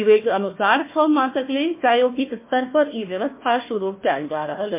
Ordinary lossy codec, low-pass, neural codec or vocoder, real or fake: MP3, 24 kbps; 3.6 kHz; codec, 16 kHz, 1 kbps, FunCodec, trained on Chinese and English, 50 frames a second; fake